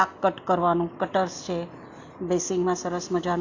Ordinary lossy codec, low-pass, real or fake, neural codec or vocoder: AAC, 48 kbps; 7.2 kHz; real; none